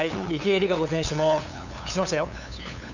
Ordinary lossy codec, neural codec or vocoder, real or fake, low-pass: none; codec, 16 kHz, 8 kbps, FunCodec, trained on LibriTTS, 25 frames a second; fake; 7.2 kHz